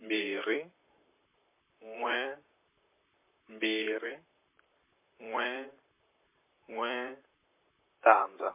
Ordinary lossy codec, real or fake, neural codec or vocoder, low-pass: MP3, 24 kbps; fake; vocoder, 44.1 kHz, 128 mel bands, Pupu-Vocoder; 3.6 kHz